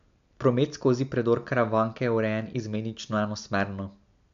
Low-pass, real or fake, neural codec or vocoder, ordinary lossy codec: 7.2 kHz; real; none; MP3, 64 kbps